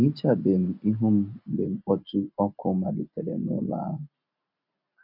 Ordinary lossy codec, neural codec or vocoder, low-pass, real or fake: none; none; 5.4 kHz; real